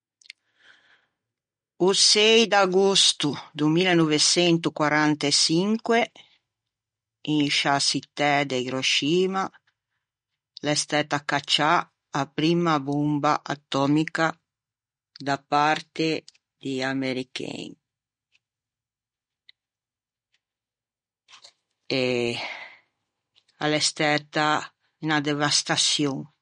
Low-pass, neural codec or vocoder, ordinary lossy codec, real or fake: 9.9 kHz; none; MP3, 48 kbps; real